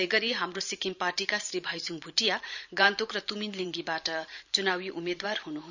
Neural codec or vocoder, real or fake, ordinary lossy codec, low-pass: vocoder, 44.1 kHz, 128 mel bands every 512 samples, BigVGAN v2; fake; none; 7.2 kHz